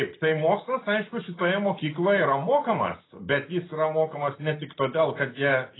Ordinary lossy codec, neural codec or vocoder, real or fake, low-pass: AAC, 16 kbps; none; real; 7.2 kHz